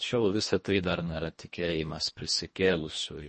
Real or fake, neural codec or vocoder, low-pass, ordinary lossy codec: fake; codec, 24 kHz, 1.5 kbps, HILCodec; 10.8 kHz; MP3, 32 kbps